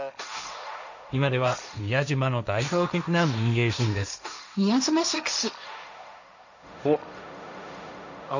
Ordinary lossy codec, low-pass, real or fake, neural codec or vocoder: none; 7.2 kHz; fake; codec, 16 kHz, 1.1 kbps, Voila-Tokenizer